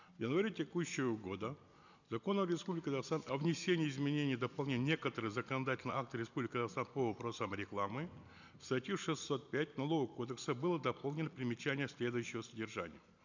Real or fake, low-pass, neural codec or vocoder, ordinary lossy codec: real; 7.2 kHz; none; none